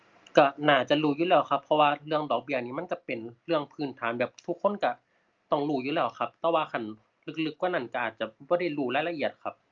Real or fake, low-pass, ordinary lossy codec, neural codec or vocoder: real; 7.2 kHz; Opus, 24 kbps; none